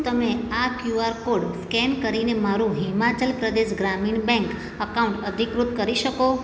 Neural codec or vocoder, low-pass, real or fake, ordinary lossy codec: none; none; real; none